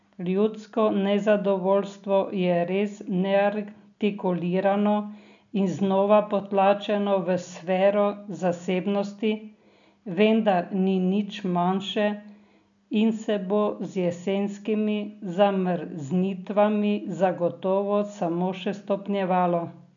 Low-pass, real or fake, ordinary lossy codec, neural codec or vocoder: 7.2 kHz; real; none; none